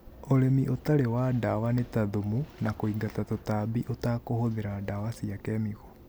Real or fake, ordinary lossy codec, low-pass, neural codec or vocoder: real; none; none; none